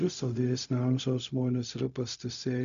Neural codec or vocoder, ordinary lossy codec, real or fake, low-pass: codec, 16 kHz, 0.4 kbps, LongCat-Audio-Codec; MP3, 96 kbps; fake; 7.2 kHz